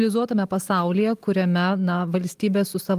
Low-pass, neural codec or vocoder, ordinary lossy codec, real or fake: 14.4 kHz; none; Opus, 32 kbps; real